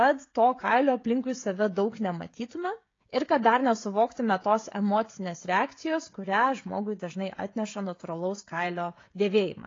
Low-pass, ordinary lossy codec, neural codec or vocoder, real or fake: 7.2 kHz; AAC, 32 kbps; codec, 16 kHz, 16 kbps, FreqCodec, smaller model; fake